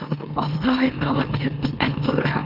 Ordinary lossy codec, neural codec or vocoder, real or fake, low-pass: Opus, 24 kbps; autoencoder, 44.1 kHz, a latent of 192 numbers a frame, MeloTTS; fake; 5.4 kHz